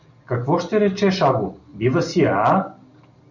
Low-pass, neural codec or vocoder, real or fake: 7.2 kHz; none; real